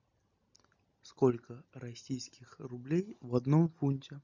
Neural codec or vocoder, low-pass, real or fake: none; 7.2 kHz; real